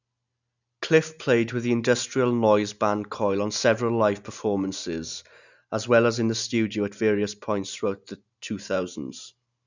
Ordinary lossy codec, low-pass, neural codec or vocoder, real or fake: none; 7.2 kHz; none; real